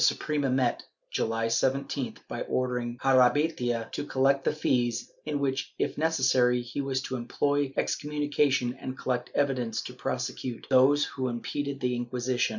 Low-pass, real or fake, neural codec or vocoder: 7.2 kHz; real; none